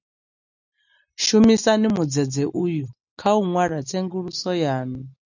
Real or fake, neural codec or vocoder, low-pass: real; none; 7.2 kHz